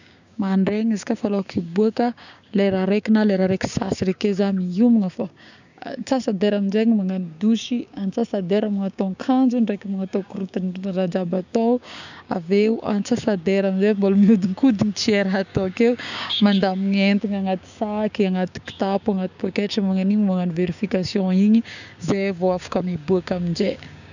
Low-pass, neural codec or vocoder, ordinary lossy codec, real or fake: 7.2 kHz; codec, 16 kHz, 6 kbps, DAC; none; fake